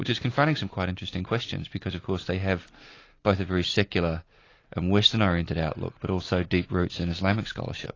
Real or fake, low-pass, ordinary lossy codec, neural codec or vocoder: real; 7.2 kHz; AAC, 32 kbps; none